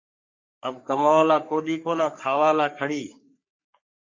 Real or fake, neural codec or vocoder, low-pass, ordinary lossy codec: fake; codec, 44.1 kHz, 3.4 kbps, Pupu-Codec; 7.2 kHz; MP3, 48 kbps